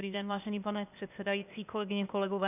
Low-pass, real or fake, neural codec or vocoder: 3.6 kHz; fake; codec, 16 kHz, 0.8 kbps, ZipCodec